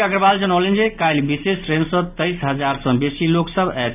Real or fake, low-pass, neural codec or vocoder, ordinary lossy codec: real; 3.6 kHz; none; none